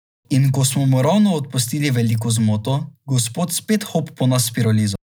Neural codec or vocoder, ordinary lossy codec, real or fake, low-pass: none; none; real; none